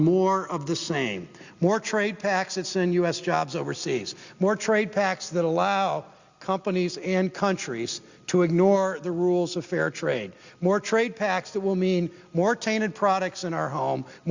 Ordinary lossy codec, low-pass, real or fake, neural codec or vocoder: Opus, 64 kbps; 7.2 kHz; real; none